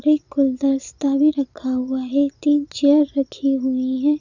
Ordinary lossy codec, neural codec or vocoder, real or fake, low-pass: none; vocoder, 22.05 kHz, 80 mel bands, Vocos; fake; 7.2 kHz